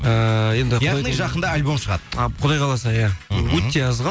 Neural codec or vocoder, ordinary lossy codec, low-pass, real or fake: none; none; none; real